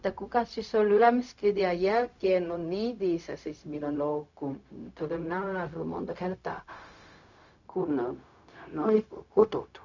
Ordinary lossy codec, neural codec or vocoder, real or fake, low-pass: none; codec, 16 kHz, 0.4 kbps, LongCat-Audio-Codec; fake; 7.2 kHz